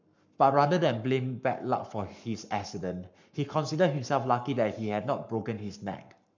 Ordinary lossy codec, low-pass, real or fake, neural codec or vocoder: none; 7.2 kHz; fake; codec, 44.1 kHz, 7.8 kbps, Pupu-Codec